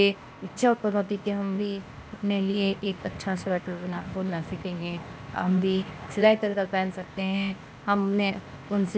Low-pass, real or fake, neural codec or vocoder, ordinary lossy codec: none; fake; codec, 16 kHz, 0.8 kbps, ZipCodec; none